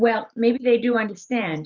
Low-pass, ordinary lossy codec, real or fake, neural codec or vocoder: 7.2 kHz; Opus, 64 kbps; fake; vocoder, 44.1 kHz, 128 mel bands every 512 samples, BigVGAN v2